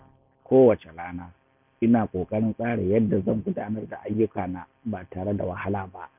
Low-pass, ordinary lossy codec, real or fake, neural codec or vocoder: 3.6 kHz; none; real; none